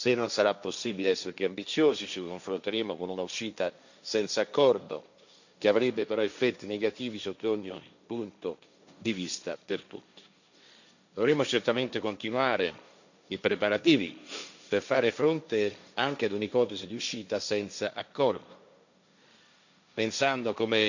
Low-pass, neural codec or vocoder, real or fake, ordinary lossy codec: 7.2 kHz; codec, 16 kHz, 1.1 kbps, Voila-Tokenizer; fake; none